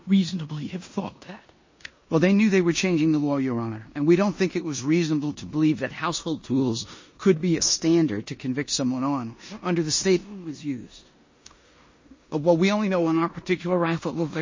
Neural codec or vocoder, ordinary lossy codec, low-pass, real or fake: codec, 16 kHz in and 24 kHz out, 0.9 kbps, LongCat-Audio-Codec, four codebook decoder; MP3, 32 kbps; 7.2 kHz; fake